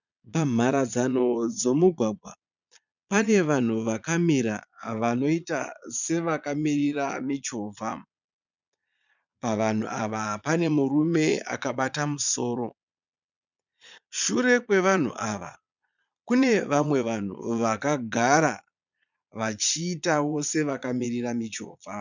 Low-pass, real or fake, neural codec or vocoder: 7.2 kHz; fake; vocoder, 22.05 kHz, 80 mel bands, Vocos